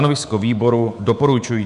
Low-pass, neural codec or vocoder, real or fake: 10.8 kHz; codec, 24 kHz, 3.1 kbps, DualCodec; fake